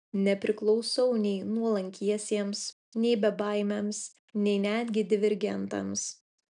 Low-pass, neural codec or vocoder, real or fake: 9.9 kHz; none; real